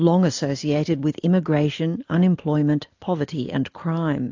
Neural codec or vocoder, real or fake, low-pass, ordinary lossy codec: none; real; 7.2 kHz; AAC, 48 kbps